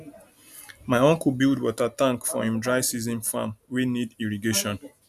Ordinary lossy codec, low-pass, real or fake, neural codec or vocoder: none; 14.4 kHz; real; none